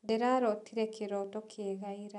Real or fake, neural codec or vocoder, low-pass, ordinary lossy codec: real; none; 10.8 kHz; none